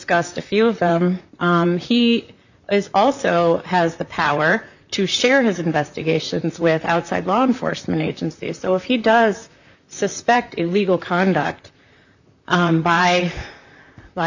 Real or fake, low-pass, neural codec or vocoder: fake; 7.2 kHz; vocoder, 44.1 kHz, 128 mel bands, Pupu-Vocoder